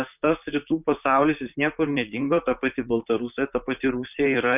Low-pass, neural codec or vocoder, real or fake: 3.6 kHz; vocoder, 44.1 kHz, 128 mel bands, Pupu-Vocoder; fake